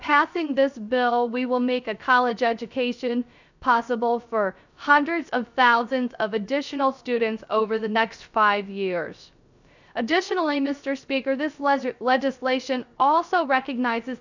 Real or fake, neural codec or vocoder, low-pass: fake; codec, 16 kHz, 0.3 kbps, FocalCodec; 7.2 kHz